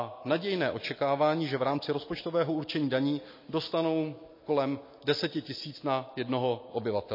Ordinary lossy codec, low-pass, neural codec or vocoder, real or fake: MP3, 24 kbps; 5.4 kHz; none; real